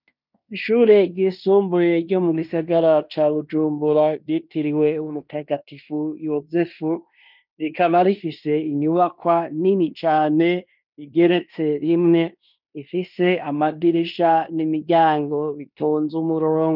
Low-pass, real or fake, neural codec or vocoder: 5.4 kHz; fake; codec, 16 kHz in and 24 kHz out, 0.9 kbps, LongCat-Audio-Codec, fine tuned four codebook decoder